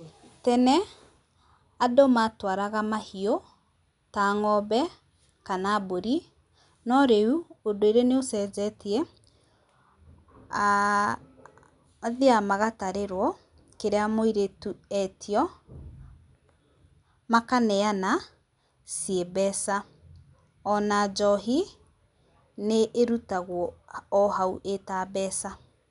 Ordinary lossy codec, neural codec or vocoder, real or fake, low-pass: none; none; real; 10.8 kHz